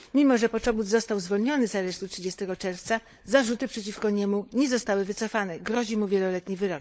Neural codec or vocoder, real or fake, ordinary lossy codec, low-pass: codec, 16 kHz, 16 kbps, FunCodec, trained on LibriTTS, 50 frames a second; fake; none; none